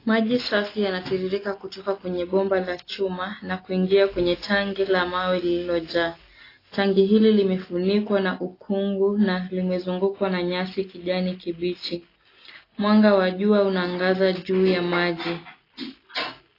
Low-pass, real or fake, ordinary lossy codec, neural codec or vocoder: 5.4 kHz; real; AAC, 24 kbps; none